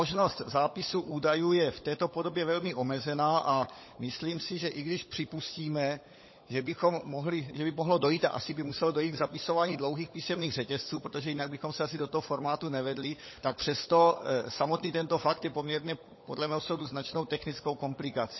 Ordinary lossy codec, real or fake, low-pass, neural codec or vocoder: MP3, 24 kbps; fake; 7.2 kHz; codec, 16 kHz, 16 kbps, FunCodec, trained on LibriTTS, 50 frames a second